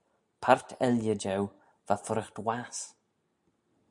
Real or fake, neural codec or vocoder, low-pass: real; none; 10.8 kHz